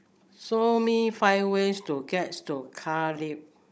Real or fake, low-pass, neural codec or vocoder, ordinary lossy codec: fake; none; codec, 16 kHz, 16 kbps, FunCodec, trained on Chinese and English, 50 frames a second; none